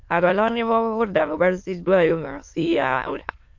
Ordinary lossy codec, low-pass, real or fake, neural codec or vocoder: MP3, 48 kbps; 7.2 kHz; fake; autoencoder, 22.05 kHz, a latent of 192 numbers a frame, VITS, trained on many speakers